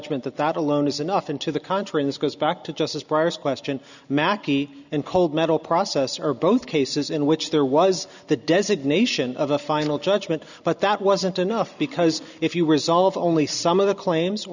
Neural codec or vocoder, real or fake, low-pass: none; real; 7.2 kHz